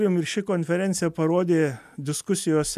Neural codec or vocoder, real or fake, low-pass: none; real; 14.4 kHz